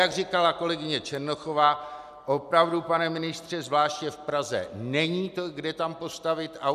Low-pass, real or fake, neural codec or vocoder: 14.4 kHz; real; none